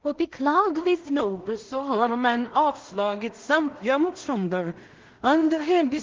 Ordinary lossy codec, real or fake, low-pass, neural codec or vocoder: Opus, 16 kbps; fake; 7.2 kHz; codec, 16 kHz in and 24 kHz out, 0.4 kbps, LongCat-Audio-Codec, two codebook decoder